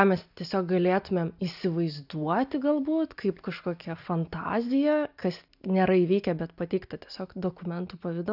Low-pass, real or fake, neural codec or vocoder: 5.4 kHz; real; none